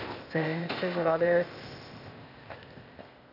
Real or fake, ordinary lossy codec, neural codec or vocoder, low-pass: fake; none; codec, 16 kHz, 0.8 kbps, ZipCodec; 5.4 kHz